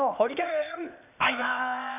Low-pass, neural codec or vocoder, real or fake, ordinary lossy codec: 3.6 kHz; codec, 16 kHz, 0.8 kbps, ZipCodec; fake; none